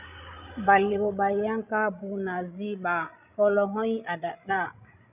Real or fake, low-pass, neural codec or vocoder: fake; 3.6 kHz; codec, 16 kHz, 16 kbps, FreqCodec, larger model